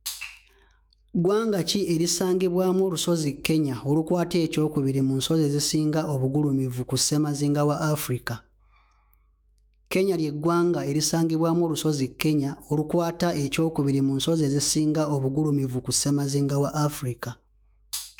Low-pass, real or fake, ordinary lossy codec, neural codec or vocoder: none; fake; none; autoencoder, 48 kHz, 128 numbers a frame, DAC-VAE, trained on Japanese speech